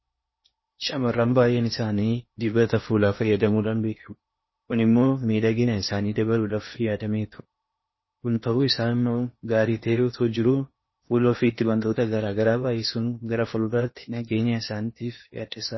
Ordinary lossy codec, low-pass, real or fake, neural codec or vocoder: MP3, 24 kbps; 7.2 kHz; fake; codec, 16 kHz in and 24 kHz out, 0.8 kbps, FocalCodec, streaming, 65536 codes